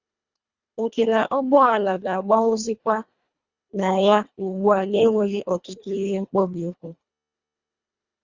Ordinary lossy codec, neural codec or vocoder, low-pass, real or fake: Opus, 64 kbps; codec, 24 kHz, 1.5 kbps, HILCodec; 7.2 kHz; fake